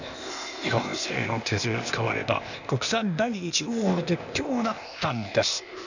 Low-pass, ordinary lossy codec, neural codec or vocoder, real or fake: 7.2 kHz; none; codec, 16 kHz, 0.8 kbps, ZipCodec; fake